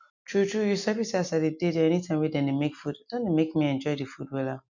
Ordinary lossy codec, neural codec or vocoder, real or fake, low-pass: none; none; real; 7.2 kHz